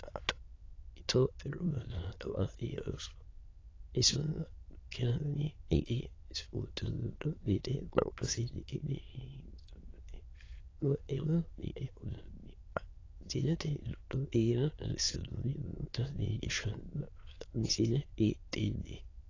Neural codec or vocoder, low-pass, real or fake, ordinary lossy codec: autoencoder, 22.05 kHz, a latent of 192 numbers a frame, VITS, trained on many speakers; 7.2 kHz; fake; AAC, 32 kbps